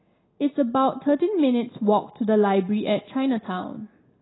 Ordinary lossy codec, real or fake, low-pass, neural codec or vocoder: AAC, 16 kbps; real; 7.2 kHz; none